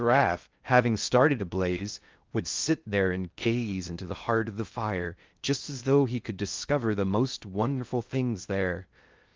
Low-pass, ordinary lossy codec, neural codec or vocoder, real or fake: 7.2 kHz; Opus, 24 kbps; codec, 16 kHz in and 24 kHz out, 0.6 kbps, FocalCodec, streaming, 2048 codes; fake